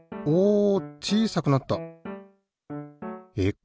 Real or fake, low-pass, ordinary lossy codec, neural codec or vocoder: real; none; none; none